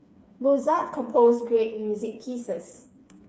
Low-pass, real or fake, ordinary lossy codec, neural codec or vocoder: none; fake; none; codec, 16 kHz, 4 kbps, FreqCodec, smaller model